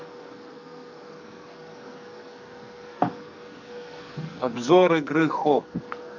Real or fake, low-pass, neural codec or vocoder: fake; 7.2 kHz; codec, 44.1 kHz, 2.6 kbps, SNAC